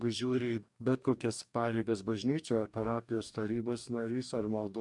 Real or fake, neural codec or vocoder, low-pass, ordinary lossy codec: fake; codec, 44.1 kHz, 2.6 kbps, DAC; 10.8 kHz; MP3, 96 kbps